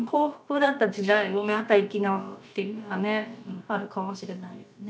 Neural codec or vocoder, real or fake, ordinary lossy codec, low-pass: codec, 16 kHz, about 1 kbps, DyCAST, with the encoder's durations; fake; none; none